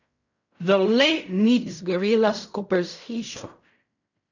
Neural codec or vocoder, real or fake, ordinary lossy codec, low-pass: codec, 16 kHz in and 24 kHz out, 0.4 kbps, LongCat-Audio-Codec, fine tuned four codebook decoder; fake; none; 7.2 kHz